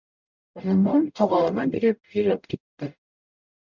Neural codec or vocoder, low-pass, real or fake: codec, 44.1 kHz, 0.9 kbps, DAC; 7.2 kHz; fake